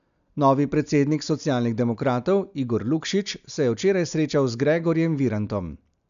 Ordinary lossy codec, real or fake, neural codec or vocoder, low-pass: MP3, 96 kbps; real; none; 7.2 kHz